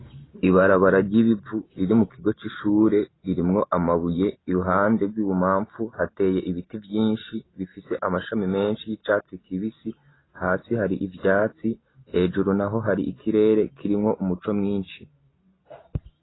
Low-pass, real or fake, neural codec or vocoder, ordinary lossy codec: 7.2 kHz; real; none; AAC, 16 kbps